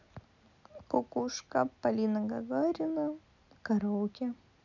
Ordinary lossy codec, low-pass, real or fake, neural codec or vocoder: none; 7.2 kHz; real; none